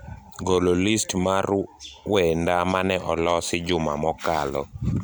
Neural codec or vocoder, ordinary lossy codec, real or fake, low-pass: vocoder, 44.1 kHz, 128 mel bands every 256 samples, BigVGAN v2; none; fake; none